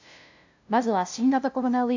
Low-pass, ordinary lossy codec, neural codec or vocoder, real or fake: 7.2 kHz; AAC, 48 kbps; codec, 16 kHz, 0.5 kbps, FunCodec, trained on LibriTTS, 25 frames a second; fake